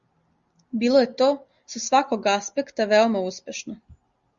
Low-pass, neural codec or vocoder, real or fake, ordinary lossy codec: 7.2 kHz; none; real; Opus, 64 kbps